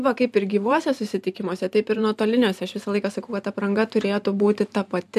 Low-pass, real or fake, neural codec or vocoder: 14.4 kHz; fake; vocoder, 44.1 kHz, 128 mel bands every 512 samples, BigVGAN v2